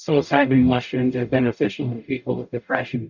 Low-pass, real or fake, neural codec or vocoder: 7.2 kHz; fake; codec, 44.1 kHz, 0.9 kbps, DAC